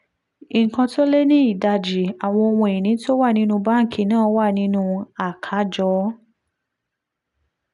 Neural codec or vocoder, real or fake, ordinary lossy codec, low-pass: none; real; none; 10.8 kHz